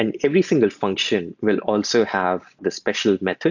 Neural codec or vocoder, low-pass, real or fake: none; 7.2 kHz; real